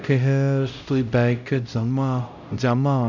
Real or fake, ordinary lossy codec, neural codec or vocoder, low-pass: fake; none; codec, 16 kHz, 0.5 kbps, X-Codec, HuBERT features, trained on LibriSpeech; 7.2 kHz